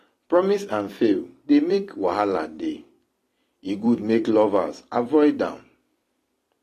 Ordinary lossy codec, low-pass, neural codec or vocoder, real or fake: AAC, 48 kbps; 14.4 kHz; vocoder, 44.1 kHz, 128 mel bands every 256 samples, BigVGAN v2; fake